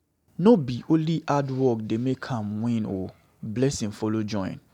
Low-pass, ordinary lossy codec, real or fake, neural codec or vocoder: 19.8 kHz; none; real; none